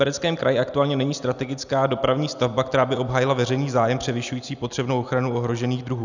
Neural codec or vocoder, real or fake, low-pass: none; real; 7.2 kHz